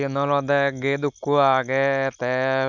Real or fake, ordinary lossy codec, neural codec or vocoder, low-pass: real; none; none; 7.2 kHz